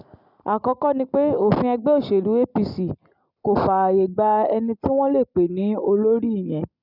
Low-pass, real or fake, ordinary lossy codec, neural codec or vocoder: 5.4 kHz; real; none; none